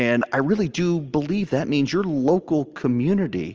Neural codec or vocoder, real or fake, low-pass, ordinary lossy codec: none; real; 7.2 kHz; Opus, 24 kbps